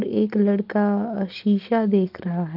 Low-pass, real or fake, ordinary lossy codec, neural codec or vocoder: 5.4 kHz; real; Opus, 32 kbps; none